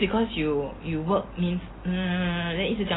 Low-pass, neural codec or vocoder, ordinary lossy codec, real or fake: 7.2 kHz; none; AAC, 16 kbps; real